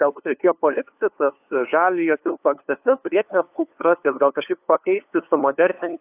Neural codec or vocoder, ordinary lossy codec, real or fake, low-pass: codec, 16 kHz, 2 kbps, FunCodec, trained on LibriTTS, 25 frames a second; AAC, 32 kbps; fake; 3.6 kHz